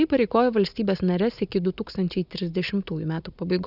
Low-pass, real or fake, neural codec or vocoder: 5.4 kHz; real; none